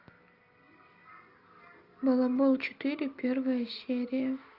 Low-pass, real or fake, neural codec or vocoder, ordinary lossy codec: 5.4 kHz; real; none; Opus, 32 kbps